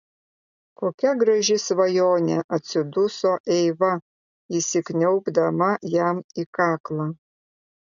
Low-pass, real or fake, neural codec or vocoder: 7.2 kHz; real; none